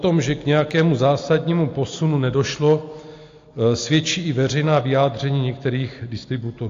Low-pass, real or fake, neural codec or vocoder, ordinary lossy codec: 7.2 kHz; real; none; AAC, 48 kbps